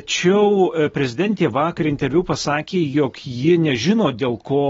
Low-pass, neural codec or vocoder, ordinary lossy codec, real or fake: 7.2 kHz; none; AAC, 24 kbps; real